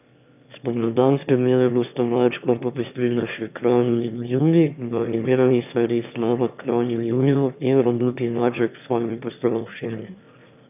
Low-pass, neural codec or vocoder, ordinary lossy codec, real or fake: 3.6 kHz; autoencoder, 22.05 kHz, a latent of 192 numbers a frame, VITS, trained on one speaker; none; fake